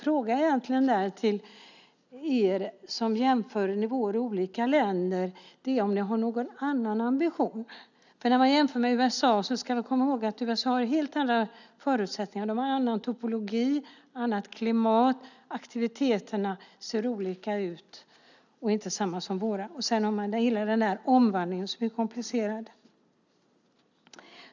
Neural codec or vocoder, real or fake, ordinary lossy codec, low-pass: none; real; none; 7.2 kHz